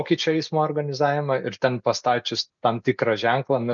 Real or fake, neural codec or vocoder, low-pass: real; none; 7.2 kHz